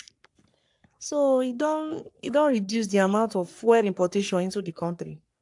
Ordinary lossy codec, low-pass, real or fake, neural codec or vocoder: AAC, 64 kbps; 10.8 kHz; fake; codec, 44.1 kHz, 3.4 kbps, Pupu-Codec